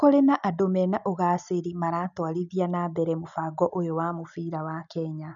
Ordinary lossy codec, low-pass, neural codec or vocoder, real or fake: none; 7.2 kHz; none; real